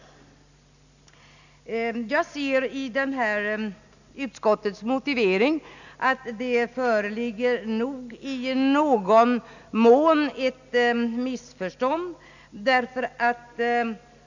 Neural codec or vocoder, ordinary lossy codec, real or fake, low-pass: none; none; real; 7.2 kHz